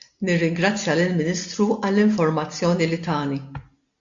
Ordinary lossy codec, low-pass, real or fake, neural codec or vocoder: AAC, 64 kbps; 7.2 kHz; real; none